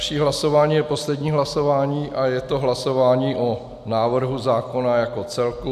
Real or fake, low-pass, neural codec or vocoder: real; 14.4 kHz; none